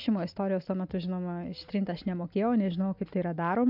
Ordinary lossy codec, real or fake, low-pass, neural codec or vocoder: AAC, 48 kbps; real; 5.4 kHz; none